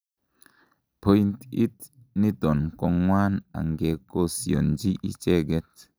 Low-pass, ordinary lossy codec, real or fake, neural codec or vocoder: none; none; real; none